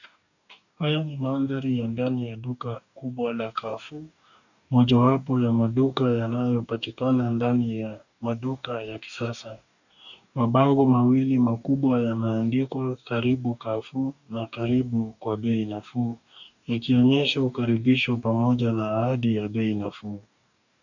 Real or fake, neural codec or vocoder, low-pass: fake; codec, 44.1 kHz, 2.6 kbps, DAC; 7.2 kHz